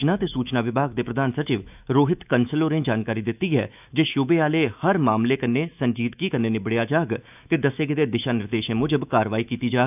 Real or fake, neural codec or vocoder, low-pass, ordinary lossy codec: fake; autoencoder, 48 kHz, 128 numbers a frame, DAC-VAE, trained on Japanese speech; 3.6 kHz; none